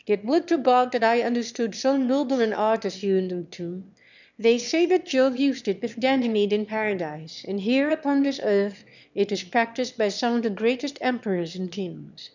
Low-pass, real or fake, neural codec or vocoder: 7.2 kHz; fake; autoencoder, 22.05 kHz, a latent of 192 numbers a frame, VITS, trained on one speaker